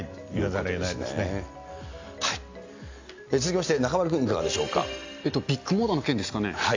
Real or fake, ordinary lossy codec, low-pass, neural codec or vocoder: real; AAC, 48 kbps; 7.2 kHz; none